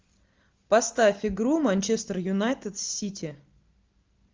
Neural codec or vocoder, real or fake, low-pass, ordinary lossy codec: none; real; 7.2 kHz; Opus, 32 kbps